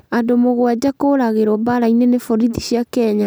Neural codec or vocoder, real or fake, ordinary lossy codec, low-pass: none; real; none; none